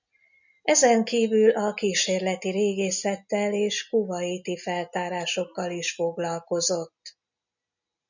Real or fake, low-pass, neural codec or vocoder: real; 7.2 kHz; none